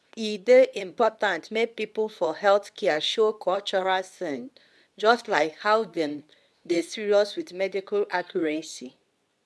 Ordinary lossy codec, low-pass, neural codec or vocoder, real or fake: none; none; codec, 24 kHz, 0.9 kbps, WavTokenizer, medium speech release version 2; fake